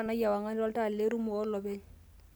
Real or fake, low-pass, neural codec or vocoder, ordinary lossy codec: real; none; none; none